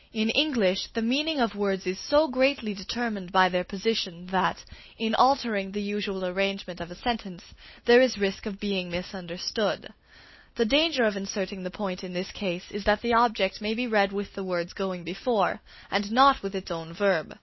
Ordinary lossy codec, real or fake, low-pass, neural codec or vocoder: MP3, 24 kbps; real; 7.2 kHz; none